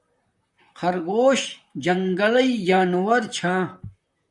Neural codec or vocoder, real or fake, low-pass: vocoder, 44.1 kHz, 128 mel bands, Pupu-Vocoder; fake; 10.8 kHz